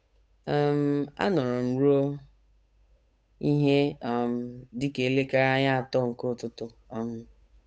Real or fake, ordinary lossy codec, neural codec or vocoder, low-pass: fake; none; codec, 16 kHz, 8 kbps, FunCodec, trained on Chinese and English, 25 frames a second; none